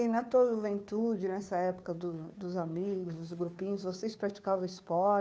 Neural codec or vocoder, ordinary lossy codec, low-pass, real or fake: codec, 16 kHz, 2 kbps, FunCodec, trained on Chinese and English, 25 frames a second; none; none; fake